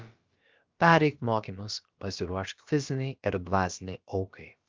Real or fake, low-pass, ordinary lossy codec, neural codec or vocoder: fake; 7.2 kHz; Opus, 32 kbps; codec, 16 kHz, about 1 kbps, DyCAST, with the encoder's durations